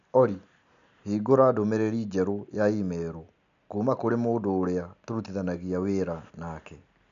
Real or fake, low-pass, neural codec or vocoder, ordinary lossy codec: real; 7.2 kHz; none; MP3, 64 kbps